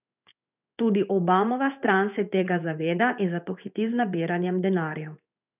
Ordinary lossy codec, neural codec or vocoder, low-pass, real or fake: none; codec, 16 kHz in and 24 kHz out, 1 kbps, XY-Tokenizer; 3.6 kHz; fake